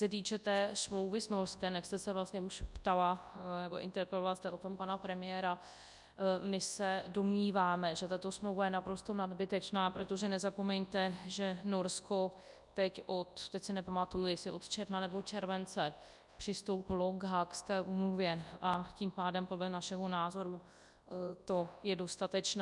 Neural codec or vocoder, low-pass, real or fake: codec, 24 kHz, 0.9 kbps, WavTokenizer, large speech release; 10.8 kHz; fake